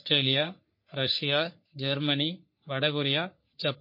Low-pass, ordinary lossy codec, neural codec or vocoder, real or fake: 5.4 kHz; MP3, 32 kbps; codec, 44.1 kHz, 3.4 kbps, Pupu-Codec; fake